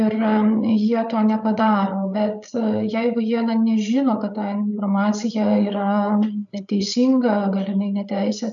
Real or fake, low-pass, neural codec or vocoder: fake; 7.2 kHz; codec, 16 kHz, 8 kbps, FreqCodec, larger model